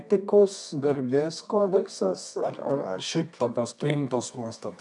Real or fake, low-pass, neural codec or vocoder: fake; 10.8 kHz; codec, 24 kHz, 0.9 kbps, WavTokenizer, medium music audio release